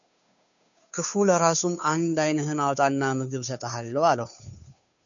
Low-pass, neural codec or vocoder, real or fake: 7.2 kHz; codec, 16 kHz, 2 kbps, FunCodec, trained on Chinese and English, 25 frames a second; fake